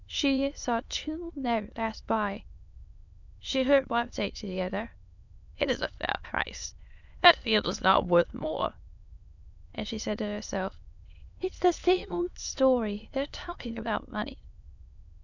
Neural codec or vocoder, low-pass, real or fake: autoencoder, 22.05 kHz, a latent of 192 numbers a frame, VITS, trained on many speakers; 7.2 kHz; fake